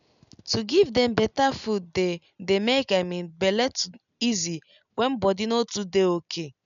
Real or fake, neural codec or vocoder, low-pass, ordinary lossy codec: real; none; 7.2 kHz; none